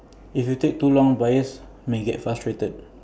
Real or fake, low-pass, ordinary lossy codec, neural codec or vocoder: real; none; none; none